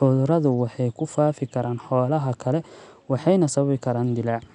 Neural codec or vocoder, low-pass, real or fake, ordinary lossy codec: none; 10.8 kHz; real; none